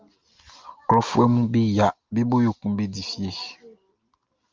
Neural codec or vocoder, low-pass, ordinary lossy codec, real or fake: none; 7.2 kHz; Opus, 24 kbps; real